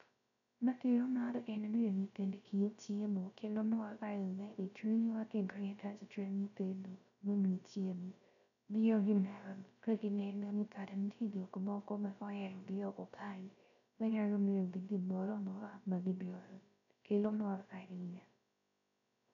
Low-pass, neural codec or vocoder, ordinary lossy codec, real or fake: 7.2 kHz; codec, 16 kHz, 0.3 kbps, FocalCodec; none; fake